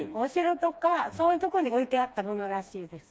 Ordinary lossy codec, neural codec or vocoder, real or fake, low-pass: none; codec, 16 kHz, 2 kbps, FreqCodec, smaller model; fake; none